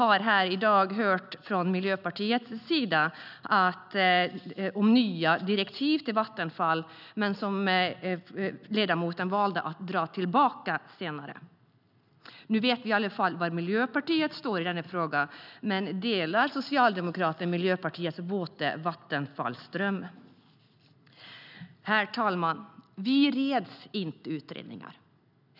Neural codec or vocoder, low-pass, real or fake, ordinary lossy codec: none; 5.4 kHz; real; none